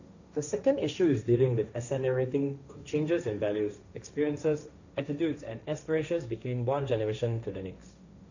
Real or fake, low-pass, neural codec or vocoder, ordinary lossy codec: fake; 7.2 kHz; codec, 16 kHz, 1.1 kbps, Voila-Tokenizer; none